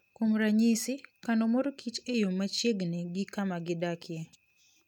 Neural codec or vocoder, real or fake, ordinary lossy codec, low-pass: none; real; none; 19.8 kHz